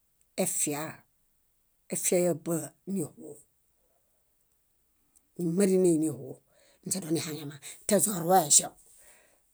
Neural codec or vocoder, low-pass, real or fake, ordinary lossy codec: none; none; real; none